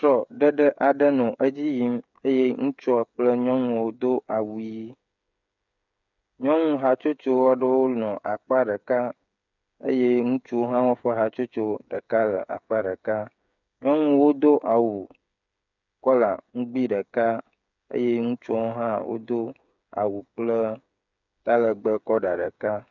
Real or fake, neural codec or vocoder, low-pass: fake; codec, 16 kHz, 8 kbps, FreqCodec, smaller model; 7.2 kHz